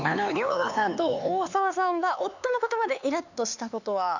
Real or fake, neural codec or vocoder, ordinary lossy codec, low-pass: fake; codec, 16 kHz, 4 kbps, X-Codec, HuBERT features, trained on LibriSpeech; none; 7.2 kHz